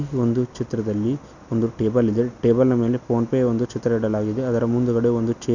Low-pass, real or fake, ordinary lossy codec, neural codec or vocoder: 7.2 kHz; real; none; none